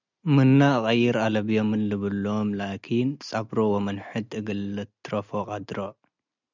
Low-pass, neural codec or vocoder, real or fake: 7.2 kHz; none; real